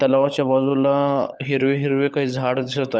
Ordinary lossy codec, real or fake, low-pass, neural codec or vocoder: none; fake; none; codec, 16 kHz, 16 kbps, FunCodec, trained on LibriTTS, 50 frames a second